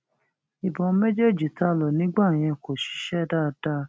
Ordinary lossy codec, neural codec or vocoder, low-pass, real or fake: none; none; none; real